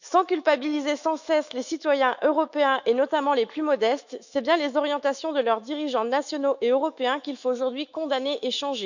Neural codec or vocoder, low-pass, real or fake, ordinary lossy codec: autoencoder, 48 kHz, 128 numbers a frame, DAC-VAE, trained on Japanese speech; 7.2 kHz; fake; none